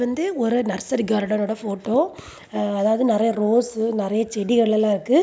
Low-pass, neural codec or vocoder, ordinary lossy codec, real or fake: none; none; none; real